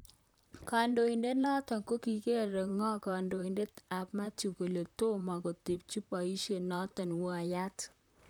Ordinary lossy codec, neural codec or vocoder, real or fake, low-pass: none; vocoder, 44.1 kHz, 128 mel bands, Pupu-Vocoder; fake; none